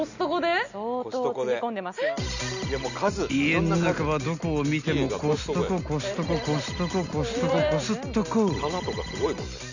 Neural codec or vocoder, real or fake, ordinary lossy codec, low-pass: none; real; none; 7.2 kHz